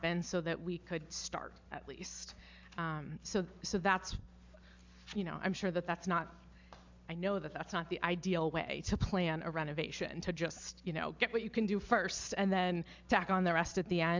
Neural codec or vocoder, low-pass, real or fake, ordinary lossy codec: none; 7.2 kHz; real; MP3, 64 kbps